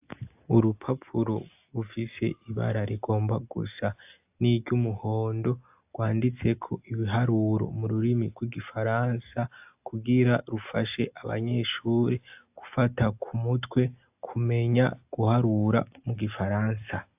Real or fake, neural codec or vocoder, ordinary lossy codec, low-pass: real; none; AAC, 32 kbps; 3.6 kHz